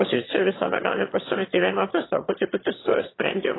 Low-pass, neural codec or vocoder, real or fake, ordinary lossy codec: 7.2 kHz; autoencoder, 22.05 kHz, a latent of 192 numbers a frame, VITS, trained on one speaker; fake; AAC, 16 kbps